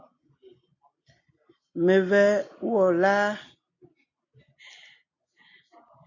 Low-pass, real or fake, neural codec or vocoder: 7.2 kHz; real; none